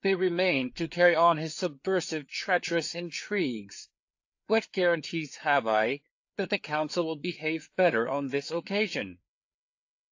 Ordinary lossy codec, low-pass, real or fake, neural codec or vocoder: AAC, 48 kbps; 7.2 kHz; fake; codec, 16 kHz, 4 kbps, FreqCodec, larger model